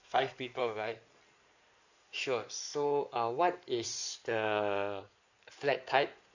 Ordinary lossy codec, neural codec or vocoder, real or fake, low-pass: none; codec, 16 kHz in and 24 kHz out, 2.2 kbps, FireRedTTS-2 codec; fake; 7.2 kHz